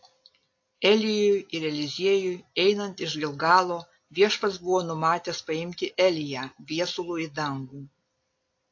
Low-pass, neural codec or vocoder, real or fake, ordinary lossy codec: 7.2 kHz; none; real; AAC, 48 kbps